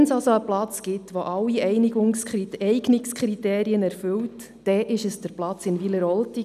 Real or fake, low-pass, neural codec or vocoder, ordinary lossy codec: real; 14.4 kHz; none; none